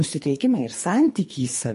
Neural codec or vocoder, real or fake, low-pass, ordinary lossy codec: codec, 24 kHz, 3 kbps, HILCodec; fake; 10.8 kHz; MP3, 48 kbps